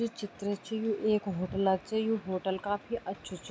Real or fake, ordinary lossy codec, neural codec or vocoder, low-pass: real; none; none; none